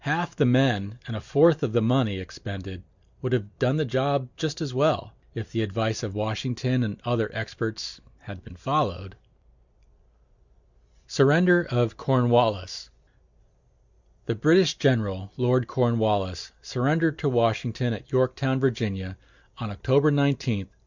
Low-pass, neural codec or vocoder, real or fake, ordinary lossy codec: 7.2 kHz; none; real; Opus, 64 kbps